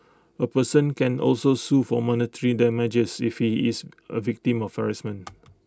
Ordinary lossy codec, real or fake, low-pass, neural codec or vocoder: none; real; none; none